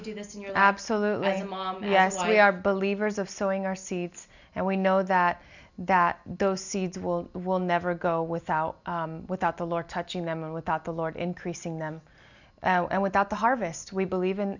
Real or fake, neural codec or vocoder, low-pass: real; none; 7.2 kHz